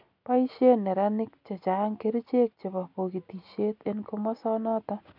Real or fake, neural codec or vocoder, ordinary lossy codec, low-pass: real; none; none; 5.4 kHz